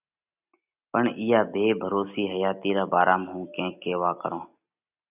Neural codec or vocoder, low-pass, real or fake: none; 3.6 kHz; real